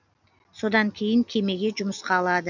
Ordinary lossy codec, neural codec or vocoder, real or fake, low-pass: none; none; real; 7.2 kHz